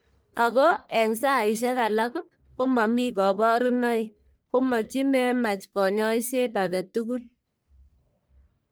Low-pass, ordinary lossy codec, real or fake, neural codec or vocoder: none; none; fake; codec, 44.1 kHz, 1.7 kbps, Pupu-Codec